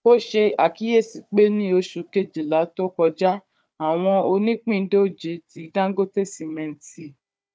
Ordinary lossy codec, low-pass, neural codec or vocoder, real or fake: none; none; codec, 16 kHz, 4 kbps, FunCodec, trained on Chinese and English, 50 frames a second; fake